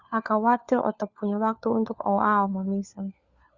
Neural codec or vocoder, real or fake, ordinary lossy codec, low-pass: codec, 16 kHz, 4 kbps, FunCodec, trained on LibriTTS, 50 frames a second; fake; Opus, 64 kbps; 7.2 kHz